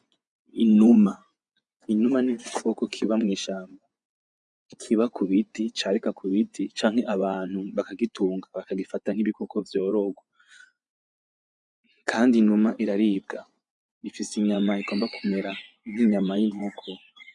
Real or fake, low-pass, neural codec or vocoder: fake; 10.8 kHz; vocoder, 24 kHz, 100 mel bands, Vocos